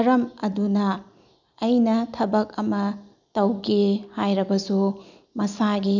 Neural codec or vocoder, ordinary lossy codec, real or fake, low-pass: none; none; real; 7.2 kHz